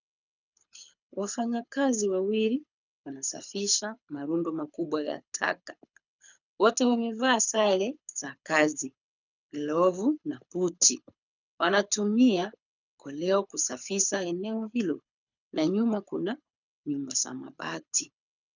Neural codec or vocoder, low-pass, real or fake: codec, 24 kHz, 6 kbps, HILCodec; 7.2 kHz; fake